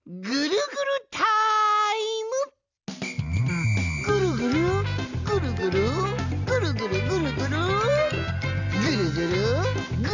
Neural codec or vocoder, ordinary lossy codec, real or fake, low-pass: none; none; real; 7.2 kHz